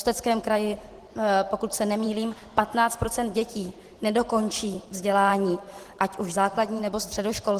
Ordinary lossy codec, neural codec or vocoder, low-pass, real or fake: Opus, 16 kbps; vocoder, 44.1 kHz, 128 mel bands every 512 samples, BigVGAN v2; 14.4 kHz; fake